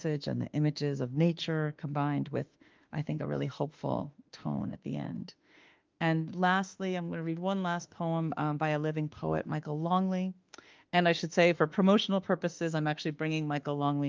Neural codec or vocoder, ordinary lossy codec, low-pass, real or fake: autoencoder, 48 kHz, 32 numbers a frame, DAC-VAE, trained on Japanese speech; Opus, 24 kbps; 7.2 kHz; fake